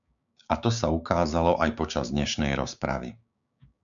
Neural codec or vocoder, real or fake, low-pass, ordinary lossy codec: codec, 16 kHz, 6 kbps, DAC; fake; 7.2 kHz; MP3, 96 kbps